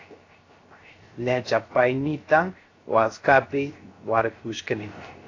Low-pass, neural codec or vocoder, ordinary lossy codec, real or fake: 7.2 kHz; codec, 16 kHz, 0.3 kbps, FocalCodec; AAC, 32 kbps; fake